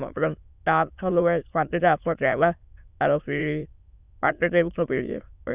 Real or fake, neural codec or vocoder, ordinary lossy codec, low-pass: fake; autoencoder, 22.05 kHz, a latent of 192 numbers a frame, VITS, trained on many speakers; Opus, 64 kbps; 3.6 kHz